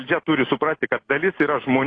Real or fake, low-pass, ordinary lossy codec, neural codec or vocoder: real; 9.9 kHz; AAC, 32 kbps; none